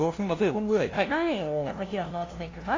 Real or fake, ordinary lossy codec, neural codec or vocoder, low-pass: fake; AAC, 32 kbps; codec, 16 kHz, 0.5 kbps, FunCodec, trained on LibriTTS, 25 frames a second; 7.2 kHz